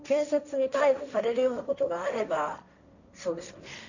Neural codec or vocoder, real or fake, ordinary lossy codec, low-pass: codec, 16 kHz, 1.1 kbps, Voila-Tokenizer; fake; none; 7.2 kHz